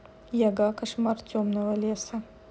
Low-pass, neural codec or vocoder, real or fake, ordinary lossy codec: none; none; real; none